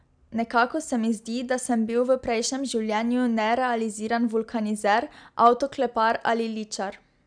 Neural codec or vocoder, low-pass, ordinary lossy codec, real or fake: none; 9.9 kHz; none; real